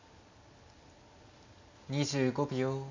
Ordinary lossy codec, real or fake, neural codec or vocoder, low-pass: MP3, 64 kbps; real; none; 7.2 kHz